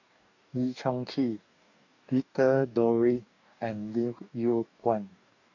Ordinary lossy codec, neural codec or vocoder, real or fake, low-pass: none; codec, 44.1 kHz, 2.6 kbps, DAC; fake; 7.2 kHz